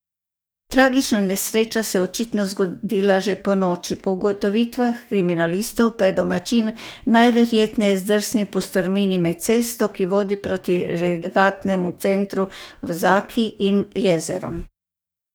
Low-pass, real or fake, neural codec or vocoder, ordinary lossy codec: none; fake; codec, 44.1 kHz, 2.6 kbps, DAC; none